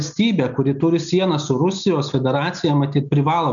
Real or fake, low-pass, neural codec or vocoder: real; 7.2 kHz; none